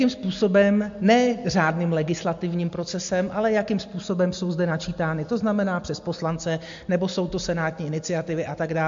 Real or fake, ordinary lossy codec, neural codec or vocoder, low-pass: real; MP3, 48 kbps; none; 7.2 kHz